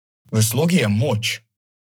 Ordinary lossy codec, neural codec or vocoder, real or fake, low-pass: none; codec, 44.1 kHz, 7.8 kbps, Pupu-Codec; fake; none